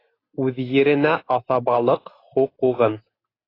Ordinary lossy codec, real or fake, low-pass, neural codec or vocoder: AAC, 24 kbps; real; 5.4 kHz; none